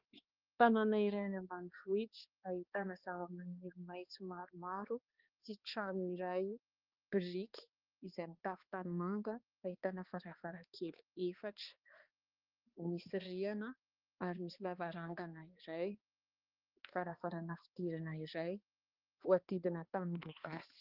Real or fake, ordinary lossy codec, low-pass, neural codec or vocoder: fake; Opus, 32 kbps; 5.4 kHz; codec, 16 kHz, 2 kbps, X-Codec, HuBERT features, trained on balanced general audio